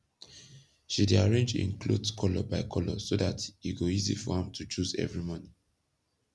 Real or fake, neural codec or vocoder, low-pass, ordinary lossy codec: real; none; none; none